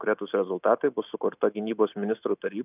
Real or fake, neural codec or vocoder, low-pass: real; none; 3.6 kHz